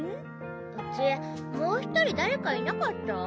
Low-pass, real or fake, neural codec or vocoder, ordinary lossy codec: none; real; none; none